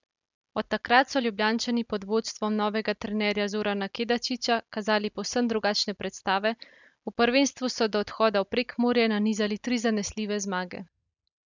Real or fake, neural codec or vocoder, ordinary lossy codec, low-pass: real; none; none; 7.2 kHz